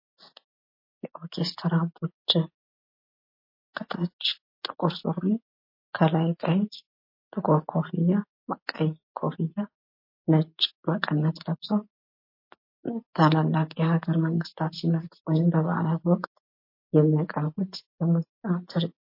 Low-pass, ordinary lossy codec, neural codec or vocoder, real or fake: 5.4 kHz; MP3, 32 kbps; none; real